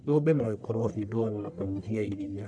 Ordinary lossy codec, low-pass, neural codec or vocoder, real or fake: none; 9.9 kHz; codec, 44.1 kHz, 1.7 kbps, Pupu-Codec; fake